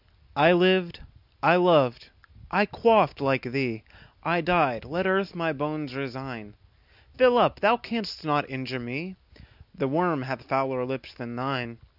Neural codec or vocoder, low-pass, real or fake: none; 5.4 kHz; real